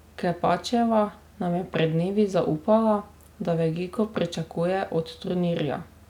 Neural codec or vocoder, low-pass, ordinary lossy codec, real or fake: none; 19.8 kHz; none; real